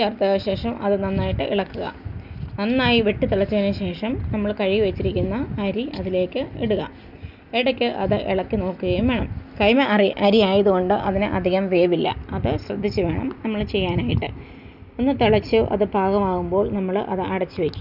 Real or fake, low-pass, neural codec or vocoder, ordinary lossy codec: real; 5.4 kHz; none; none